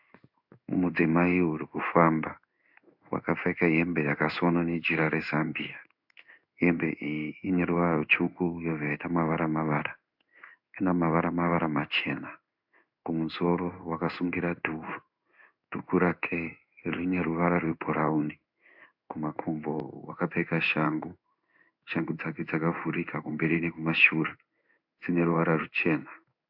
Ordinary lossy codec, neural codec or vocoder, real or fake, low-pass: AAC, 48 kbps; codec, 16 kHz in and 24 kHz out, 1 kbps, XY-Tokenizer; fake; 5.4 kHz